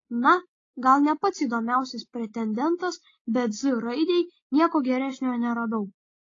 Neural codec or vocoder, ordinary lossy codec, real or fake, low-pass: none; AAC, 32 kbps; real; 7.2 kHz